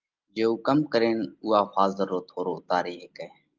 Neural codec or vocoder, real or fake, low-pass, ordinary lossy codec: none; real; 7.2 kHz; Opus, 24 kbps